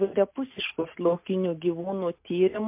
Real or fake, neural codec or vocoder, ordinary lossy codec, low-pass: real; none; MP3, 32 kbps; 3.6 kHz